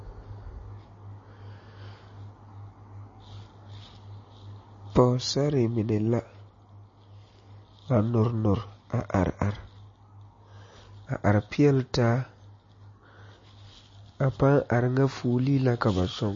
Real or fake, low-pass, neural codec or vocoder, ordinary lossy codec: real; 7.2 kHz; none; MP3, 32 kbps